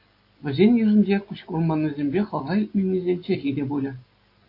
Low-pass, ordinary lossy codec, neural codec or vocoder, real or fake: 5.4 kHz; AAC, 32 kbps; none; real